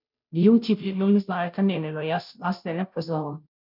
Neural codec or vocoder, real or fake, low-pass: codec, 16 kHz, 0.5 kbps, FunCodec, trained on Chinese and English, 25 frames a second; fake; 5.4 kHz